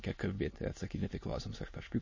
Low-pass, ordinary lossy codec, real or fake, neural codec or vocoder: 7.2 kHz; MP3, 32 kbps; fake; autoencoder, 22.05 kHz, a latent of 192 numbers a frame, VITS, trained on many speakers